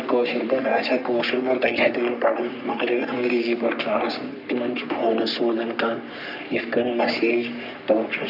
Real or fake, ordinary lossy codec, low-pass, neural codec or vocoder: fake; none; 5.4 kHz; codec, 44.1 kHz, 3.4 kbps, Pupu-Codec